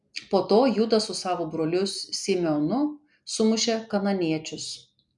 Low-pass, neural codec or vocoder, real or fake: 10.8 kHz; none; real